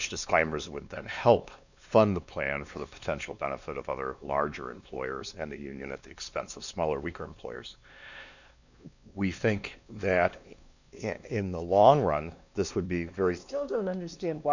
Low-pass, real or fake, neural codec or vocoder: 7.2 kHz; fake; codec, 16 kHz, 2 kbps, X-Codec, WavLM features, trained on Multilingual LibriSpeech